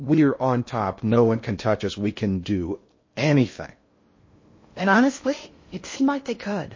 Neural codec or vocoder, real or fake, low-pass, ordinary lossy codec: codec, 16 kHz in and 24 kHz out, 0.6 kbps, FocalCodec, streaming, 2048 codes; fake; 7.2 kHz; MP3, 32 kbps